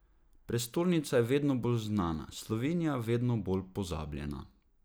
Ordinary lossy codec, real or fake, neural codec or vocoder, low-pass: none; real; none; none